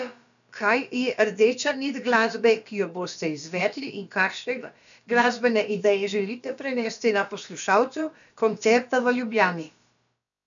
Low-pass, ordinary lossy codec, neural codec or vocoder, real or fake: 7.2 kHz; none; codec, 16 kHz, about 1 kbps, DyCAST, with the encoder's durations; fake